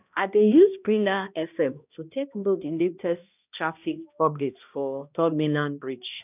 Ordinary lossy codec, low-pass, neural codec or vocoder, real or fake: none; 3.6 kHz; codec, 16 kHz, 1 kbps, X-Codec, HuBERT features, trained on balanced general audio; fake